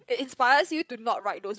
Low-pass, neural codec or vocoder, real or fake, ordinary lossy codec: none; codec, 16 kHz, 8 kbps, FreqCodec, larger model; fake; none